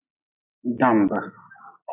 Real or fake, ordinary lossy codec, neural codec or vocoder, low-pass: real; AAC, 24 kbps; none; 3.6 kHz